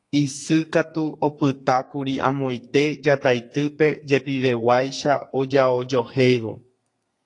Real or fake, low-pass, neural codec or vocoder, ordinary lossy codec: fake; 10.8 kHz; codec, 32 kHz, 1.9 kbps, SNAC; AAC, 48 kbps